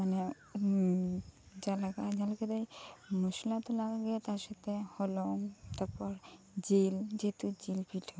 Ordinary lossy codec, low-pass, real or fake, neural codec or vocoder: none; none; real; none